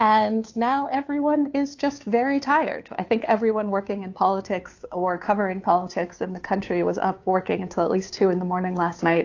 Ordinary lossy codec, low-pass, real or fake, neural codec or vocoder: AAC, 48 kbps; 7.2 kHz; fake; codec, 16 kHz, 2 kbps, FunCodec, trained on Chinese and English, 25 frames a second